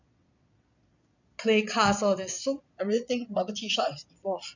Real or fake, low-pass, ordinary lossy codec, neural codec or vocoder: fake; 7.2 kHz; none; vocoder, 22.05 kHz, 80 mel bands, Vocos